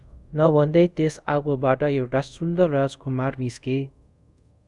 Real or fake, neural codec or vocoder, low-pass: fake; codec, 24 kHz, 0.5 kbps, DualCodec; 10.8 kHz